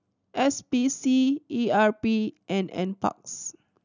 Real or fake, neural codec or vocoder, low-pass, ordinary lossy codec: real; none; 7.2 kHz; none